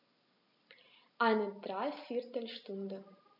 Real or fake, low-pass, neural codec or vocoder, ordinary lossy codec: real; 5.4 kHz; none; none